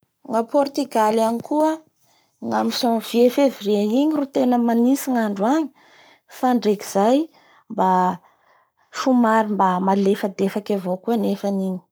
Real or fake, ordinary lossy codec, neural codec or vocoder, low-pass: fake; none; codec, 44.1 kHz, 7.8 kbps, Pupu-Codec; none